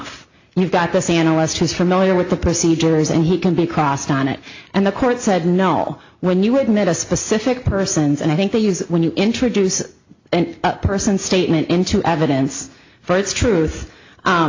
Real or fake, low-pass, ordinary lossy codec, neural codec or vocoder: real; 7.2 kHz; AAC, 48 kbps; none